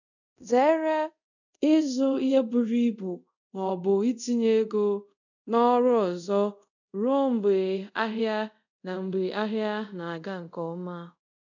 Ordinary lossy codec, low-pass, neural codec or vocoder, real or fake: none; 7.2 kHz; codec, 24 kHz, 0.5 kbps, DualCodec; fake